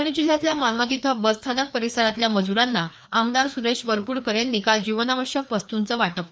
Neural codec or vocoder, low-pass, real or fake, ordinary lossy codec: codec, 16 kHz, 2 kbps, FreqCodec, larger model; none; fake; none